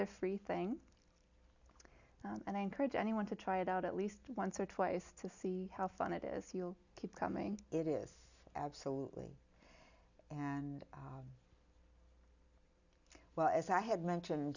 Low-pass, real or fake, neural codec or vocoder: 7.2 kHz; real; none